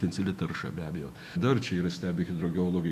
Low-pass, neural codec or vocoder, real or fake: 14.4 kHz; autoencoder, 48 kHz, 128 numbers a frame, DAC-VAE, trained on Japanese speech; fake